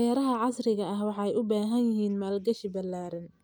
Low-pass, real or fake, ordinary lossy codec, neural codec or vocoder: none; real; none; none